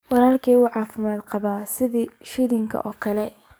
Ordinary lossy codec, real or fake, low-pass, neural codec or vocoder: none; fake; none; codec, 44.1 kHz, 7.8 kbps, DAC